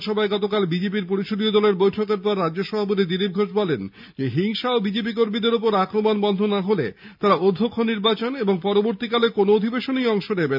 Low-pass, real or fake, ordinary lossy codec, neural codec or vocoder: 5.4 kHz; real; none; none